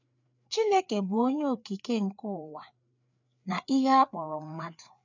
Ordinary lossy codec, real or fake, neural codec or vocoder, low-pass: none; fake; codec, 16 kHz, 4 kbps, FreqCodec, larger model; 7.2 kHz